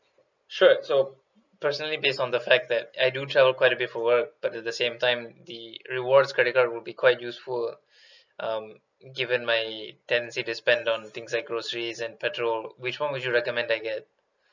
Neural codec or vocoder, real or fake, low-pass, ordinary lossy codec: none; real; 7.2 kHz; none